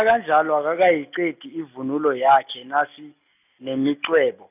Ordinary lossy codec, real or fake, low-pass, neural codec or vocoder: none; real; 3.6 kHz; none